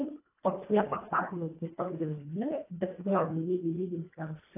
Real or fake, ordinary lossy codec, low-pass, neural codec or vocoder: fake; AAC, 24 kbps; 3.6 kHz; codec, 24 kHz, 1.5 kbps, HILCodec